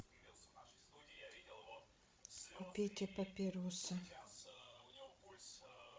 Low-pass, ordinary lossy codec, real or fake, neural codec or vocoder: none; none; fake; codec, 16 kHz, 16 kbps, FreqCodec, larger model